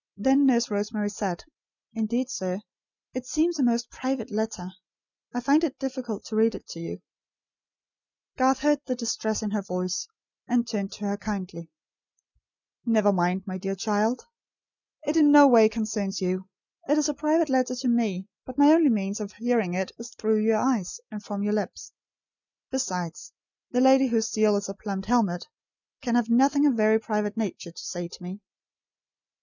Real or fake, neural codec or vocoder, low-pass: real; none; 7.2 kHz